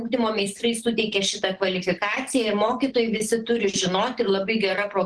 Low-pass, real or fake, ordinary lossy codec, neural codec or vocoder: 9.9 kHz; real; Opus, 16 kbps; none